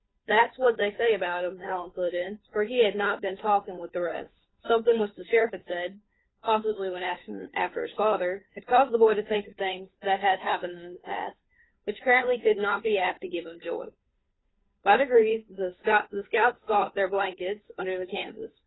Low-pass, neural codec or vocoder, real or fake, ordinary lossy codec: 7.2 kHz; codec, 16 kHz, 2 kbps, FunCodec, trained on Chinese and English, 25 frames a second; fake; AAC, 16 kbps